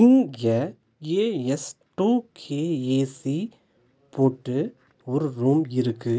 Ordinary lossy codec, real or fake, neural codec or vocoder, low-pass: none; real; none; none